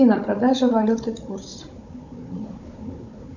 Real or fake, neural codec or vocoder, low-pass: fake; codec, 16 kHz, 16 kbps, FunCodec, trained on Chinese and English, 50 frames a second; 7.2 kHz